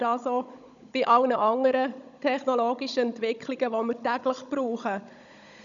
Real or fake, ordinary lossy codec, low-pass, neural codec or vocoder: fake; none; 7.2 kHz; codec, 16 kHz, 16 kbps, FunCodec, trained on Chinese and English, 50 frames a second